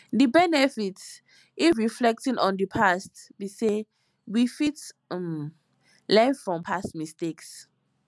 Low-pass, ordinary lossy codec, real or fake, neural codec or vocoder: none; none; real; none